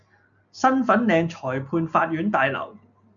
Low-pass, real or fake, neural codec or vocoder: 7.2 kHz; real; none